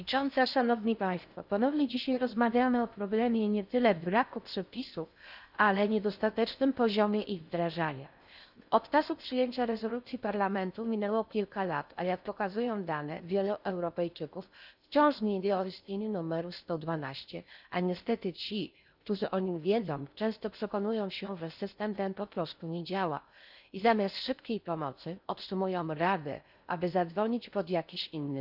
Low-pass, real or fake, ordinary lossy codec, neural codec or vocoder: 5.4 kHz; fake; none; codec, 16 kHz in and 24 kHz out, 0.6 kbps, FocalCodec, streaming, 4096 codes